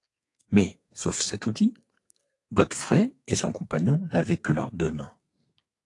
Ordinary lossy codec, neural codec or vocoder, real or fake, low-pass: AAC, 48 kbps; codec, 32 kHz, 1.9 kbps, SNAC; fake; 10.8 kHz